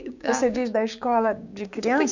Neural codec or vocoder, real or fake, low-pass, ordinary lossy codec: codec, 16 kHz, 6 kbps, DAC; fake; 7.2 kHz; none